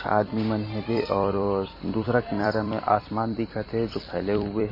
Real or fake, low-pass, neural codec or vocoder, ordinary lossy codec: fake; 5.4 kHz; vocoder, 44.1 kHz, 128 mel bands every 256 samples, BigVGAN v2; MP3, 24 kbps